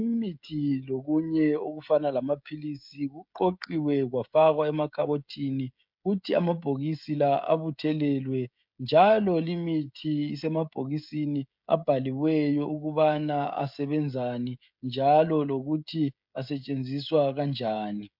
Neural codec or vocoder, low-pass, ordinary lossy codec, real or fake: codec, 16 kHz, 16 kbps, FreqCodec, smaller model; 5.4 kHz; MP3, 48 kbps; fake